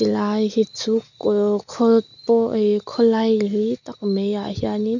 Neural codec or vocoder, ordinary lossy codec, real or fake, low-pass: none; none; real; 7.2 kHz